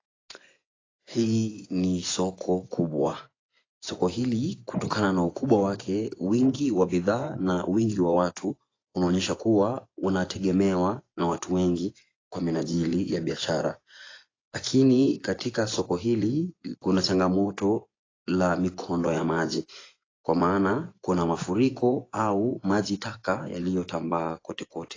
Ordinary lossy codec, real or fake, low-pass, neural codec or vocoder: AAC, 32 kbps; fake; 7.2 kHz; vocoder, 24 kHz, 100 mel bands, Vocos